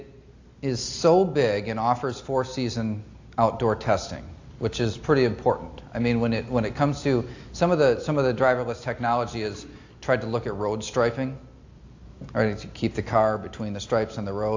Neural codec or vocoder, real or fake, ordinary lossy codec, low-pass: none; real; AAC, 48 kbps; 7.2 kHz